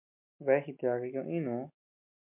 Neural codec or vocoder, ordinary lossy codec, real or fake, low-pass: none; MP3, 32 kbps; real; 3.6 kHz